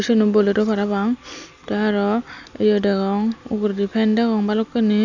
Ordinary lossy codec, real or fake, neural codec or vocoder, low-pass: none; real; none; 7.2 kHz